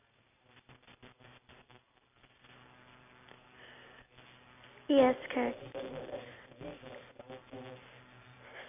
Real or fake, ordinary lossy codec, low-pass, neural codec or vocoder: real; none; 3.6 kHz; none